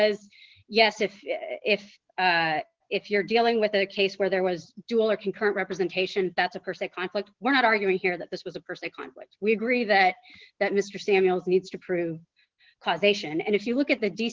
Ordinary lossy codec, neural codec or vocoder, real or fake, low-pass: Opus, 16 kbps; none; real; 7.2 kHz